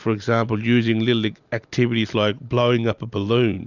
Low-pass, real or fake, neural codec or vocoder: 7.2 kHz; real; none